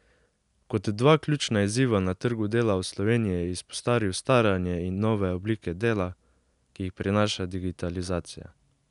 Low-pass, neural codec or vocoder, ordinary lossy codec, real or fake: 10.8 kHz; none; none; real